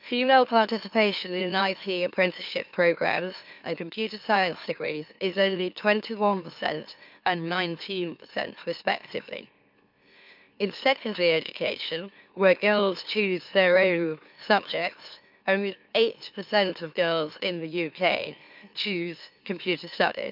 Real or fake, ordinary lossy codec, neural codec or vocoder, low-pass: fake; MP3, 48 kbps; autoencoder, 44.1 kHz, a latent of 192 numbers a frame, MeloTTS; 5.4 kHz